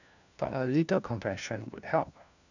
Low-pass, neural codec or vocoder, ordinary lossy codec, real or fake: 7.2 kHz; codec, 16 kHz, 1 kbps, FunCodec, trained on LibriTTS, 50 frames a second; none; fake